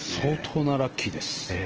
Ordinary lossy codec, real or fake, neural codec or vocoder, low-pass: Opus, 16 kbps; real; none; 7.2 kHz